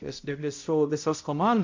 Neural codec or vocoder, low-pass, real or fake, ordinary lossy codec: codec, 16 kHz, 0.5 kbps, X-Codec, HuBERT features, trained on balanced general audio; 7.2 kHz; fake; MP3, 64 kbps